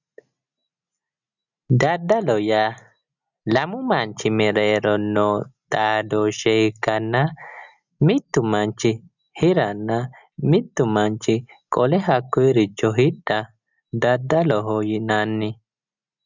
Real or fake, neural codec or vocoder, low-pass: real; none; 7.2 kHz